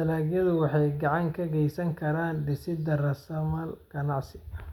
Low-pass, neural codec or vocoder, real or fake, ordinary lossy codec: 19.8 kHz; none; real; none